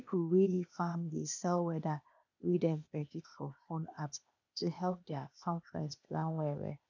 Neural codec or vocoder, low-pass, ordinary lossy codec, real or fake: codec, 16 kHz, 0.8 kbps, ZipCodec; 7.2 kHz; none; fake